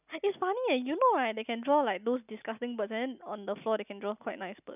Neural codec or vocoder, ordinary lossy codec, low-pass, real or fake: none; none; 3.6 kHz; real